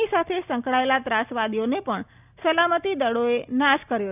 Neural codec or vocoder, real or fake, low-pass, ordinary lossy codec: none; real; 3.6 kHz; none